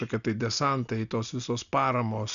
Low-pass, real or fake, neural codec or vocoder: 7.2 kHz; real; none